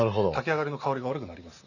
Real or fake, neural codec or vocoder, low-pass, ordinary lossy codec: real; none; 7.2 kHz; none